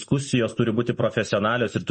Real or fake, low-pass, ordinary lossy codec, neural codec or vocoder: real; 9.9 kHz; MP3, 32 kbps; none